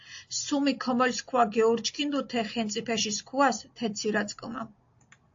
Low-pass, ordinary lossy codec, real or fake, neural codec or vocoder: 7.2 kHz; AAC, 48 kbps; real; none